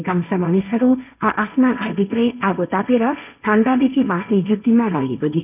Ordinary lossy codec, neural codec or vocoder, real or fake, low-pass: none; codec, 16 kHz, 1.1 kbps, Voila-Tokenizer; fake; 3.6 kHz